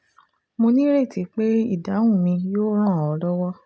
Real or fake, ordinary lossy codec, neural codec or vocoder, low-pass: real; none; none; none